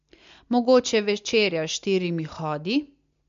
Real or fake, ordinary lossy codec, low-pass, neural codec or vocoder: real; MP3, 48 kbps; 7.2 kHz; none